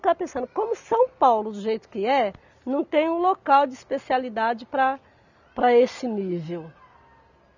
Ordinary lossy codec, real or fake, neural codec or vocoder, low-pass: none; real; none; 7.2 kHz